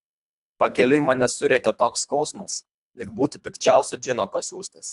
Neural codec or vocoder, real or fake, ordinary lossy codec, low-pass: codec, 24 kHz, 1.5 kbps, HILCodec; fake; AAC, 96 kbps; 10.8 kHz